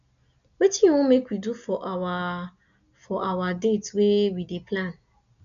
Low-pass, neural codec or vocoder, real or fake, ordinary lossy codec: 7.2 kHz; none; real; none